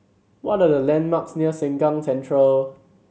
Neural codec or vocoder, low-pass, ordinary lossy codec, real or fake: none; none; none; real